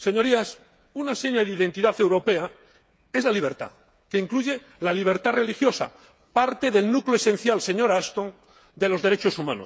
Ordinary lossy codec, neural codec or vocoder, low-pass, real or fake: none; codec, 16 kHz, 8 kbps, FreqCodec, smaller model; none; fake